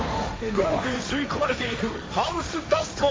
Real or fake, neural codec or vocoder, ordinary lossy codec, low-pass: fake; codec, 16 kHz, 1.1 kbps, Voila-Tokenizer; none; none